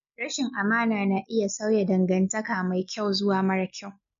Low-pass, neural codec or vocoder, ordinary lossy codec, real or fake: 7.2 kHz; none; MP3, 48 kbps; real